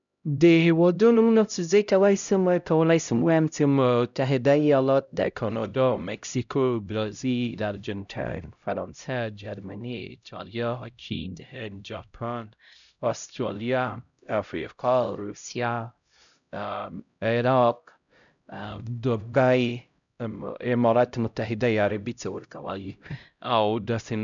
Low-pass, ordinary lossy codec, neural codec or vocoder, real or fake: 7.2 kHz; none; codec, 16 kHz, 0.5 kbps, X-Codec, HuBERT features, trained on LibriSpeech; fake